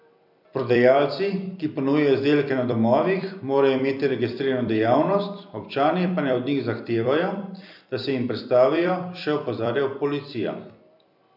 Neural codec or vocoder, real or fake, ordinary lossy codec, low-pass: vocoder, 44.1 kHz, 128 mel bands every 256 samples, BigVGAN v2; fake; none; 5.4 kHz